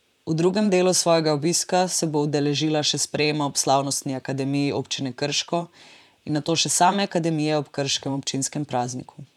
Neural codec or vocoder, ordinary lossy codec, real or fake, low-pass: vocoder, 44.1 kHz, 128 mel bands, Pupu-Vocoder; none; fake; 19.8 kHz